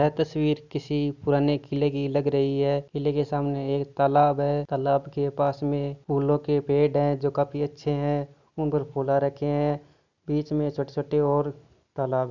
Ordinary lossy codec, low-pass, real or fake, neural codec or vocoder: none; 7.2 kHz; real; none